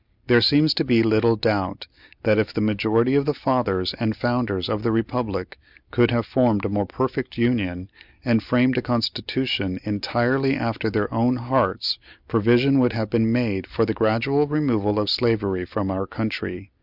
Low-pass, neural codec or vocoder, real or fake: 5.4 kHz; none; real